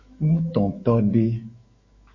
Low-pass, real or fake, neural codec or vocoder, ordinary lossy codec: 7.2 kHz; fake; autoencoder, 48 kHz, 128 numbers a frame, DAC-VAE, trained on Japanese speech; MP3, 32 kbps